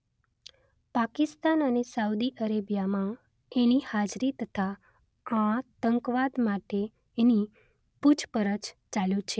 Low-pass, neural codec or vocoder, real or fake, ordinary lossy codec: none; none; real; none